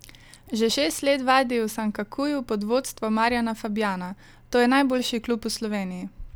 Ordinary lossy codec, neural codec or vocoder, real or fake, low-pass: none; none; real; none